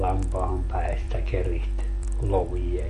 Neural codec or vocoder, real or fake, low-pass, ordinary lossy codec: vocoder, 44.1 kHz, 128 mel bands every 256 samples, BigVGAN v2; fake; 14.4 kHz; MP3, 48 kbps